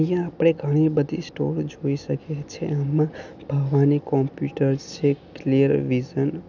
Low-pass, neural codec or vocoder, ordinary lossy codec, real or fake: 7.2 kHz; none; none; real